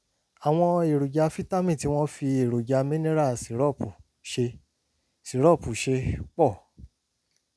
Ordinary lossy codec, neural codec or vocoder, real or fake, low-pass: none; none; real; none